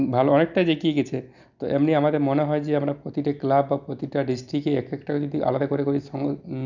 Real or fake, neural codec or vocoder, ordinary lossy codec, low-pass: real; none; none; 7.2 kHz